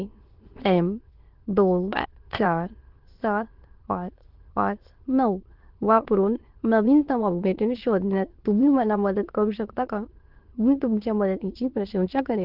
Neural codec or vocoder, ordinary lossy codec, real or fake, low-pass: autoencoder, 22.05 kHz, a latent of 192 numbers a frame, VITS, trained on many speakers; Opus, 16 kbps; fake; 5.4 kHz